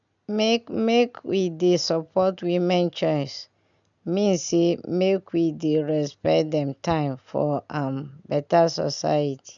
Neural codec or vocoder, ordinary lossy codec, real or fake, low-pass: none; none; real; 7.2 kHz